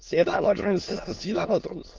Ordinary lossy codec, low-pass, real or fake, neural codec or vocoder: Opus, 24 kbps; 7.2 kHz; fake; autoencoder, 22.05 kHz, a latent of 192 numbers a frame, VITS, trained on many speakers